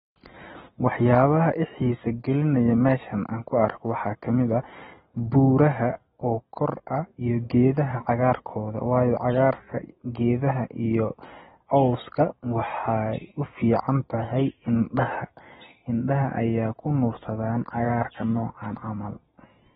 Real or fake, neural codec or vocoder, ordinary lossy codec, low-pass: real; none; AAC, 16 kbps; 7.2 kHz